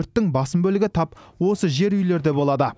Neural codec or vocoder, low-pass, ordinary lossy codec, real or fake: none; none; none; real